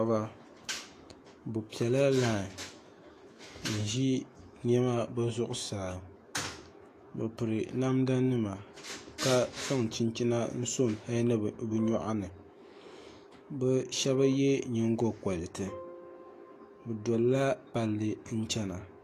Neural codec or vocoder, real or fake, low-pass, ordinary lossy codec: autoencoder, 48 kHz, 128 numbers a frame, DAC-VAE, trained on Japanese speech; fake; 14.4 kHz; AAC, 48 kbps